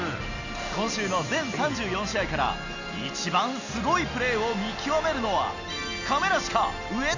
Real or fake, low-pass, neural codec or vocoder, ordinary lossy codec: real; 7.2 kHz; none; none